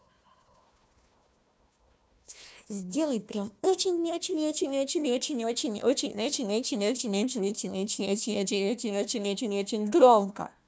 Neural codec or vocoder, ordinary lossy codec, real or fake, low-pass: codec, 16 kHz, 1 kbps, FunCodec, trained on Chinese and English, 50 frames a second; none; fake; none